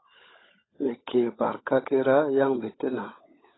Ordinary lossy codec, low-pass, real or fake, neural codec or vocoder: AAC, 16 kbps; 7.2 kHz; fake; codec, 16 kHz, 16 kbps, FunCodec, trained on LibriTTS, 50 frames a second